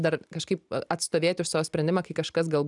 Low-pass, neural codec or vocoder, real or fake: 10.8 kHz; none; real